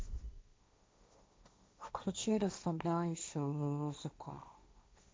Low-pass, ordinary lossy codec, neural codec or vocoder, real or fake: none; none; codec, 16 kHz, 1.1 kbps, Voila-Tokenizer; fake